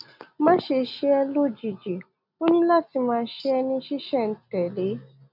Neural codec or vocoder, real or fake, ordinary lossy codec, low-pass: none; real; none; 5.4 kHz